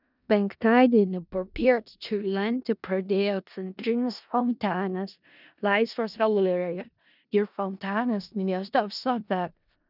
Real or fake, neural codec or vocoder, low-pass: fake; codec, 16 kHz in and 24 kHz out, 0.4 kbps, LongCat-Audio-Codec, four codebook decoder; 5.4 kHz